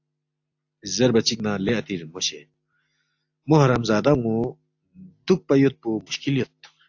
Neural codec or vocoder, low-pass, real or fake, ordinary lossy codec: none; 7.2 kHz; real; AAC, 48 kbps